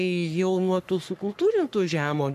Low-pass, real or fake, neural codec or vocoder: 14.4 kHz; fake; codec, 44.1 kHz, 3.4 kbps, Pupu-Codec